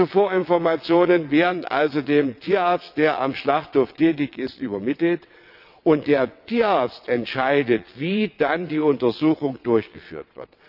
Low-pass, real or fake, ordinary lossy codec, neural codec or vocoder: 5.4 kHz; fake; none; vocoder, 22.05 kHz, 80 mel bands, WaveNeXt